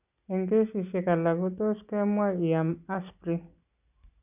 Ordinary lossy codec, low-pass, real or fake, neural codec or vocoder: none; 3.6 kHz; real; none